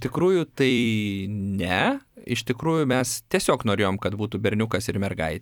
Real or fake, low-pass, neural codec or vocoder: fake; 19.8 kHz; vocoder, 44.1 kHz, 128 mel bands every 256 samples, BigVGAN v2